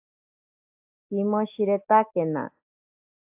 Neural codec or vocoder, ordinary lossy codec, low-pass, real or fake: none; AAC, 32 kbps; 3.6 kHz; real